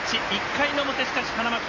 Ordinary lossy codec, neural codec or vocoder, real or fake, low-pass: MP3, 32 kbps; none; real; 7.2 kHz